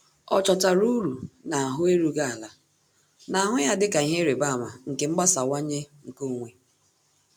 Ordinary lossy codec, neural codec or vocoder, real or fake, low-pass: none; none; real; none